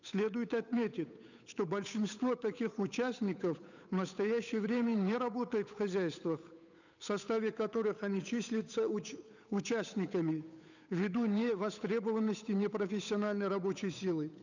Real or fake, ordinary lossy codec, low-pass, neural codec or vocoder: fake; none; 7.2 kHz; codec, 16 kHz, 8 kbps, FunCodec, trained on Chinese and English, 25 frames a second